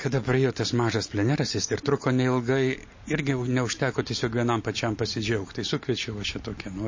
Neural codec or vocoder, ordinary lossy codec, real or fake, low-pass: none; MP3, 32 kbps; real; 7.2 kHz